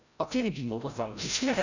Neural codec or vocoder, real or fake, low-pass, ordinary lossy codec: codec, 16 kHz, 0.5 kbps, FreqCodec, larger model; fake; 7.2 kHz; none